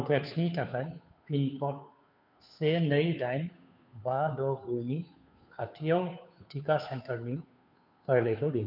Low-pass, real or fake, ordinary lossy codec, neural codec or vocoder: 5.4 kHz; fake; none; codec, 16 kHz, 2 kbps, FunCodec, trained on Chinese and English, 25 frames a second